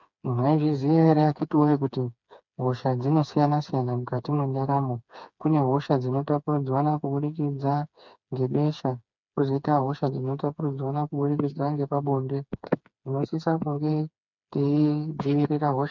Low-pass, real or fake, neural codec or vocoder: 7.2 kHz; fake; codec, 16 kHz, 4 kbps, FreqCodec, smaller model